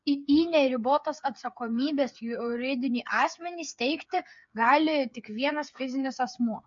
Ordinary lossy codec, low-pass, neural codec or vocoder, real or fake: MP3, 48 kbps; 7.2 kHz; codec, 16 kHz, 8 kbps, FreqCodec, smaller model; fake